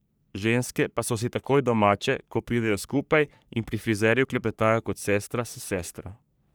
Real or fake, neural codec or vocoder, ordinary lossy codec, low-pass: fake; codec, 44.1 kHz, 3.4 kbps, Pupu-Codec; none; none